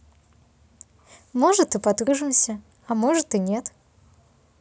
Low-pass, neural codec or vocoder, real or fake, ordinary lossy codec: none; none; real; none